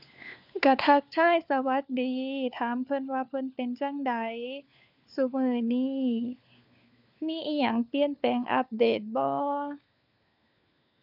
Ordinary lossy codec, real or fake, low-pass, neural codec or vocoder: none; fake; 5.4 kHz; codec, 16 kHz, 4 kbps, FunCodec, trained on LibriTTS, 50 frames a second